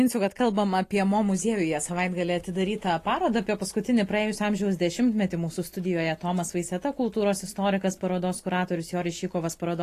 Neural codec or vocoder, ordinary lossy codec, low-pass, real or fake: none; AAC, 48 kbps; 14.4 kHz; real